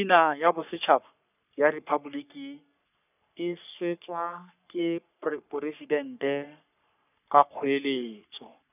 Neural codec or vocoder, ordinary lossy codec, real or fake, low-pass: codec, 44.1 kHz, 3.4 kbps, Pupu-Codec; none; fake; 3.6 kHz